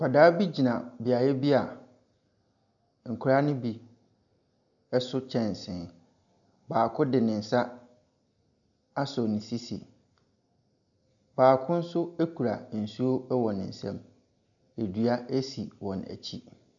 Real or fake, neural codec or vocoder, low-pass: real; none; 7.2 kHz